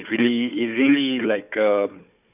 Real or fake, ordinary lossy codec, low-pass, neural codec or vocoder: fake; none; 3.6 kHz; codec, 16 kHz, 4 kbps, FunCodec, trained on Chinese and English, 50 frames a second